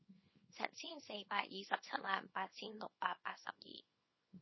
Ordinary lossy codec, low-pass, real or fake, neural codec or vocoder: MP3, 24 kbps; 7.2 kHz; fake; codec, 24 kHz, 0.9 kbps, WavTokenizer, small release